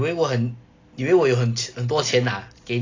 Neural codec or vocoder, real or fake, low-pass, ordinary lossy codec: none; real; 7.2 kHz; AAC, 32 kbps